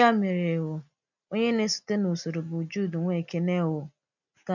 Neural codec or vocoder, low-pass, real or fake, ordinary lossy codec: none; 7.2 kHz; real; none